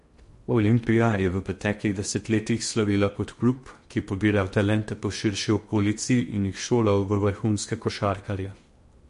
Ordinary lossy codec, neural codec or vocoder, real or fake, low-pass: MP3, 48 kbps; codec, 16 kHz in and 24 kHz out, 0.8 kbps, FocalCodec, streaming, 65536 codes; fake; 10.8 kHz